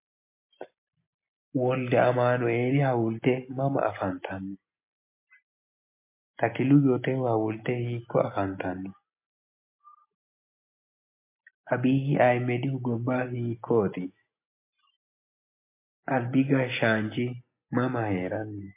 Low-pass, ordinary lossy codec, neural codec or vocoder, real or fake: 3.6 kHz; MP3, 24 kbps; none; real